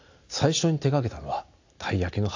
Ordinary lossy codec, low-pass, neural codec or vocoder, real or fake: AAC, 48 kbps; 7.2 kHz; none; real